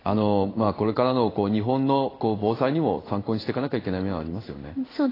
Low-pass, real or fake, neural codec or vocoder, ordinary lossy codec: 5.4 kHz; fake; codec, 16 kHz in and 24 kHz out, 1 kbps, XY-Tokenizer; AAC, 24 kbps